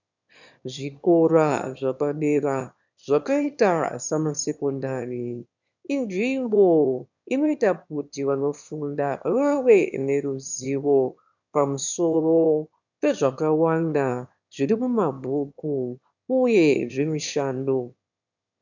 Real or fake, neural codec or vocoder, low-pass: fake; autoencoder, 22.05 kHz, a latent of 192 numbers a frame, VITS, trained on one speaker; 7.2 kHz